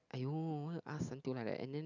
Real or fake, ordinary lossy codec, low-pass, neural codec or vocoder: real; none; 7.2 kHz; none